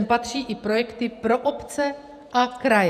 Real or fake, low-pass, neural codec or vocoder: fake; 14.4 kHz; vocoder, 48 kHz, 128 mel bands, Vocos